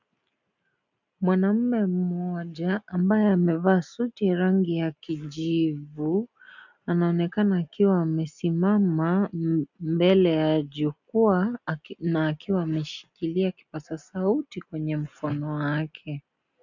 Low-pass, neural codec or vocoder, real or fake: 7.2 kHz; none; real